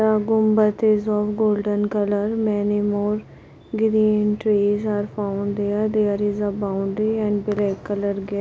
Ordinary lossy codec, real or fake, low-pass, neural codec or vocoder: none; real; none; none